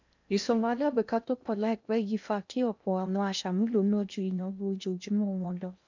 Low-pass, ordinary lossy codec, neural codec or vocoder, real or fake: 7.2 kHz; none; codec, 16 kHz in and 24 kHz out, 0.6 kbps, FocalCodec, streaming, 2048 codes; fake